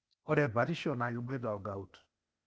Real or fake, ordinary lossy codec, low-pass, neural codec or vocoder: fake; none; none; codec, 16 kHz, 0.8 kbps, ZipCodec